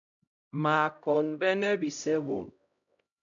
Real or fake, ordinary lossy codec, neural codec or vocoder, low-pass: fake; MP3, 96 kbps; codec, 16 kHz, 0.5 kbps, X-Codec, HuBERT features, trained on LibriSpeech; 7.2 kHz